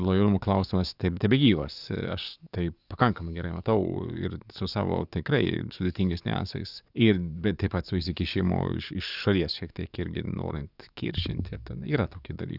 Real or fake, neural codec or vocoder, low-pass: fake; codec, 44.1 kHz, 7.8 kbps, DAC; 5.4 kHz